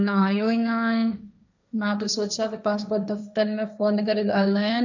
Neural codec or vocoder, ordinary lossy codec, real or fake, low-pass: codec, 16 kHz, 1.1 kbps, Voila-Tokenizer; none; fake; 7.2 kHz